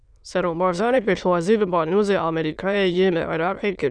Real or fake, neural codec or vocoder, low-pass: fake; autoencoder, 22.05 kHz, a latent of 192 numbers a frame, VITS, trained on many speakers; 9.9 kHz